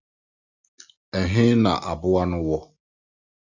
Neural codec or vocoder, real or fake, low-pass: none; real; 7.2 kHz